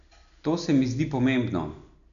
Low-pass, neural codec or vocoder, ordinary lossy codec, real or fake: 7.2 kHz; none; none; real